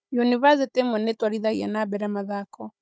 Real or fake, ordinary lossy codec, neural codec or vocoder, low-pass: fake; none; codec, 16 kHz, 16 kbps, FunCodec, trained on Chinese and English, 50 frames a second; none